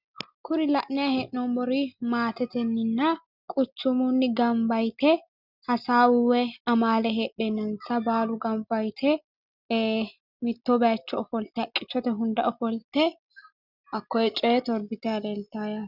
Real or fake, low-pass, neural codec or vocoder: real; 5.4 kHz; none